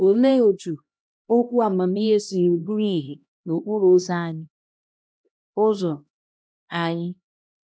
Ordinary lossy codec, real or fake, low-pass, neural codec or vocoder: none; fake; none; codec, 16 kHz, 1 kbps, X-Codec, HuBERT features, trained on LibriSpeech